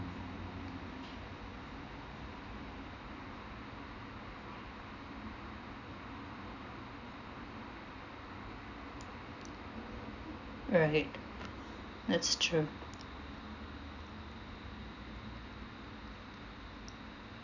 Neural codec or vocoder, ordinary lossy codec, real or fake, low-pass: none; none; real; 7.2 kHz